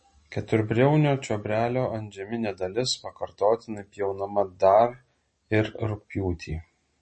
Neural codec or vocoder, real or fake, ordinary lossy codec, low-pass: none; real; MP3, 32 kbps; 10.8 kHz